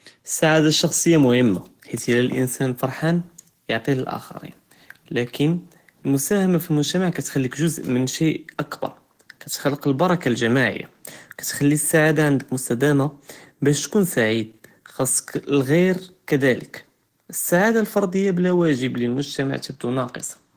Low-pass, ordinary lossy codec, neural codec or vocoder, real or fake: 14.4 kHz; Opus, 16 kbps; autoencoder, 48 kHz, 128 numbers a frame, DAC-VAE, trained on Japanese speech; fake